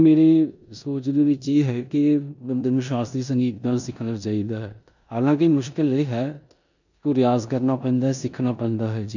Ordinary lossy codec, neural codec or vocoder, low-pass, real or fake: none; codec, 16 kHz in and 24 kHz out, 0.9 kbps, LongCat-Audio-Codec, four codebook decoder; 7.2 kHz; fake